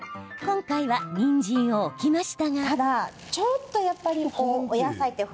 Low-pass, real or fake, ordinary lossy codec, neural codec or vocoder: none; real; none; none